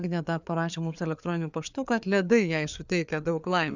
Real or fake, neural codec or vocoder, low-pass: fake; codec, 16 kHz, 4 kbps, FreqCodec, larger model; 7.2 kHz